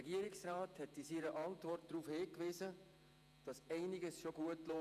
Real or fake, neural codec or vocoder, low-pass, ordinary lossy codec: fake; vocoder, 48 kHz, 128 mel bands, Vocos; 14.4 kHz; none